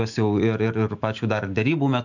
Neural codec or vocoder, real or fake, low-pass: autoencoder, 48 kHz, 128 numbers a frame, DAC-VAE, trained on Japanese speech; fake; 7.2 kHz